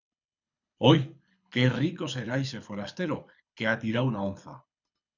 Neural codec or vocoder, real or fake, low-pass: codec, 24 kHz, 6 kbps, HILCodec; fake; 7.2 kHz